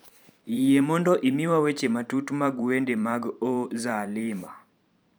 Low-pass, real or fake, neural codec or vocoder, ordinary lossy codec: none; fake; vocoder, 44.1 kHz, 128 mel bands every 512 samples, BigVGAN v2; none